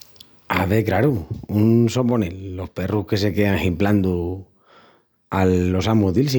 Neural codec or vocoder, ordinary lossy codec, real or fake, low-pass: none; none; real; none